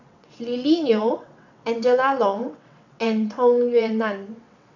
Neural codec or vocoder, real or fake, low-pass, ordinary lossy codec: vocoder, 22.05 kHz, 80 mel bands, WaveNeXt; fake; 7.2 kHz; none